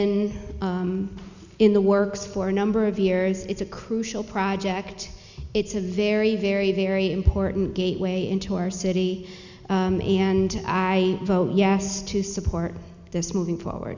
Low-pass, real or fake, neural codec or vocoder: 7.2 kHz; real; none